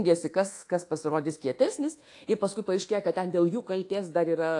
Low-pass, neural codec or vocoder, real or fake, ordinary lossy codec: 10.8 kHz; codec, 24 kHz, 1.2 kbps, DualCodec; fake; AAC, 48 kbps